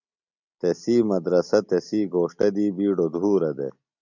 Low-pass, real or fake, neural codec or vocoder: 7.2 kHz; real; none